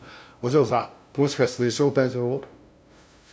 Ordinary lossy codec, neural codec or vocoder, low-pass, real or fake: none; codec, 16 kHz, 0.5 kbps, FunCodec, trained on LibriTTS, 25 frames a second; none; fake